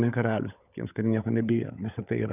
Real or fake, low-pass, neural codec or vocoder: fake; 3.6 kHz; codec, 16 kHz, 16 kbps, FunCodec, trained on LibriTTS, 50 frames a second